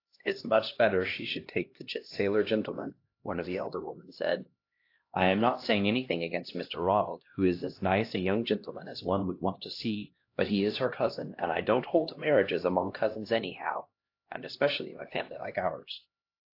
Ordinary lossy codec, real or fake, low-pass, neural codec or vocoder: AAC, 32 kbps; fake; 5.4 kHz; codec, 16 kHz, 1 kbps, X-Codec, HuBERT features, trained on LibriSpeech